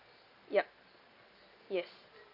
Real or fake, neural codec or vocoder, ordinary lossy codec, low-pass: real; none; Opus, 64 kbps; 5.4 kHz